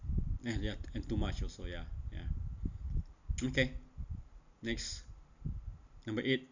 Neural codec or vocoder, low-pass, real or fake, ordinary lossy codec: none; 7.2 kHz; real; none